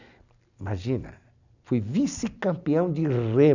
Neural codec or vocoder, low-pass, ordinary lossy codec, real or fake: none; 7.2 kHz; none; real